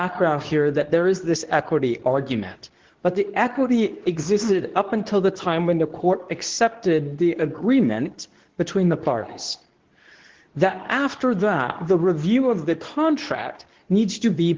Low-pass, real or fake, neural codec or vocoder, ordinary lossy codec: 7.2 kHz; fake; codec, 24 kHz, 0.9 kbps, WavTokenizer, medium speech release version 2; Opus, 16 kbps